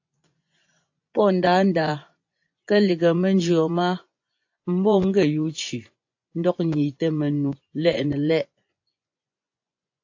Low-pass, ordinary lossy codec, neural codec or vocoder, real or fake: 7.2 kHz; AAC, 48 kbps; vocoder, 24 kHz, 100 mel bands, Vocos; fake